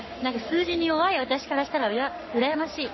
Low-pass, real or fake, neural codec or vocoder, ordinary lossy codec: 7.2 kHz; fake; codec, 44.1 kHz, 7.8 kbps, Pupu-Codec; MP3, 24 kbps